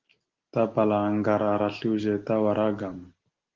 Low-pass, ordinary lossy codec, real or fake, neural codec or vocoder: 7.2 kHz; Opus, 16 kbps; real; none